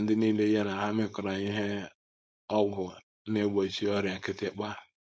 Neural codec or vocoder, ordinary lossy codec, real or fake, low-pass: codec, 16 kHz, 4.8 kbps, FACodec; none; fake; none